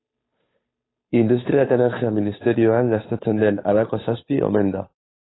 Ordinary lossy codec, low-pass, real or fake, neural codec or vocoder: AAC, 16 kbps; 7.2 kHz; fake; codec, 16 kHz, 2 kbps, FunCodec, trained on Chinese and English, 25 frames a second